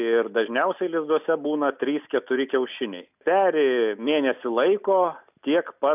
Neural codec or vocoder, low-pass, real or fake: none; 3.6 kHz; real